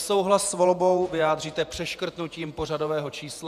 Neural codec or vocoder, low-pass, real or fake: vocoder, 48 kHz, 128 mel bands, Vocos; 14.4 kHz; fake